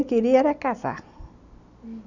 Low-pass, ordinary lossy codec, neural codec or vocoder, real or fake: 7.2 kHz; none; none; real